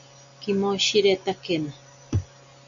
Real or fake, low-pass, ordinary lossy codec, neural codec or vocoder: real; 7.2 kHz; MP3, 64 kbps; none